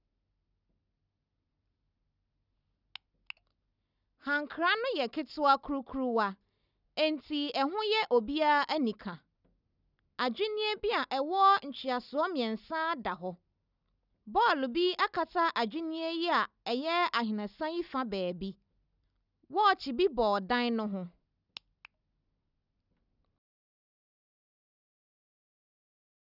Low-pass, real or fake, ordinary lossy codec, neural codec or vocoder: 5.4 kHz; real; none; none